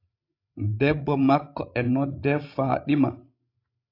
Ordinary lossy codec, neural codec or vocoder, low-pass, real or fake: AAC, 32 kbps; codec, 16 kHz, 16 kbps, FreqCodec, larger model; 5.4 kHz; fake